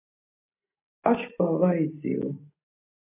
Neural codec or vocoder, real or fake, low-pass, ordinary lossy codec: none; real; 3.6 kHz; AAC, 24 kbps